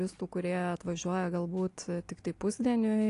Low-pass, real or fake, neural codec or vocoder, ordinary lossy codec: 10.8 kHz; real; none; AAC, 48 kbps